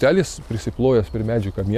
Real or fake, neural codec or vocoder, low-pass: real; none; 14.4 kHz